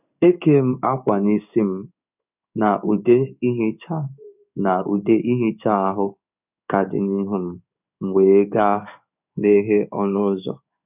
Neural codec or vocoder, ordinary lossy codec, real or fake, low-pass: codec, 16 kHz in and 24 kHz out, 1 kbps, XY-Tokenizer; none; fake; 3.6 kHz